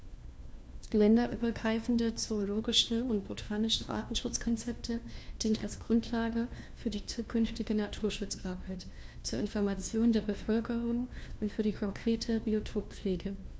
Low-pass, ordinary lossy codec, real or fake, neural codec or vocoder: none; none; fake; codec, 16 kHz, 1 kbps, FunCodec, trained on LibriTTS, 50 frames a second